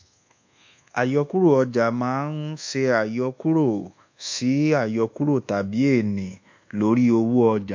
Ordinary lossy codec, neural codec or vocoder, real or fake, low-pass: MP3, 48 kbps; codec, 24 kHz, 1.2 kbps, DualCodec; fake; 7.2 kHz